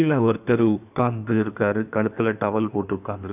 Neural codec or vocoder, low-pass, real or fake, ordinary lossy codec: codec, 16 kHz in and 24 kHz out, 1.1 kbps, FireRedTTS-2 codec; 3.6 kHz; fake; none